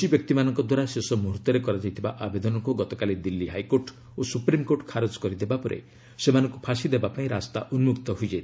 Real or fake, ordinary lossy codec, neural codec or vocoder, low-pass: real; none; none; none